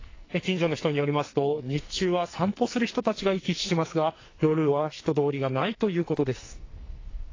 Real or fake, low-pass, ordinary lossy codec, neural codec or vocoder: fake; 7.2 kHz; AAC, 32 kbps; codec, 44.1 kHz, 2.6 kbps, SNAC